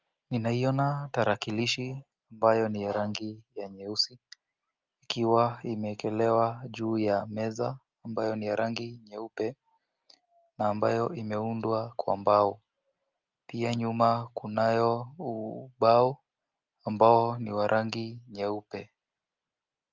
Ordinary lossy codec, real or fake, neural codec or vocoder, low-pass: Opus, 24 kbps; real; none; 7.2 kHz